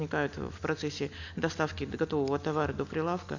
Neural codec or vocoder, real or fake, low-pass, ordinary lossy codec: none; real; 7.2 kHz; none